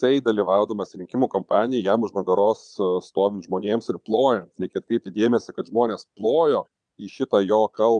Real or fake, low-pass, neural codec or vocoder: fake; 9.9 kHz; vocoder, 22.05 kHz, 80 mel bands, Vocos